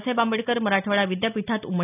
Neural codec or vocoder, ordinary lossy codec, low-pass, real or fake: none; none; 3.6 kHz; real